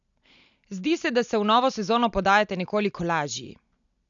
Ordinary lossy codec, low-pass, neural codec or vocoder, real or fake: none; 7.2 kHz; none; real